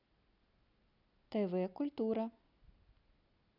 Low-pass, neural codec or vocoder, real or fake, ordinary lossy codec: 5.4 kHz; none; real; none